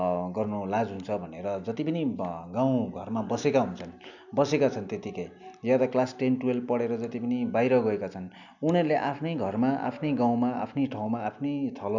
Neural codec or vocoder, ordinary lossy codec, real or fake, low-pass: none; none; real; 7.2 kHz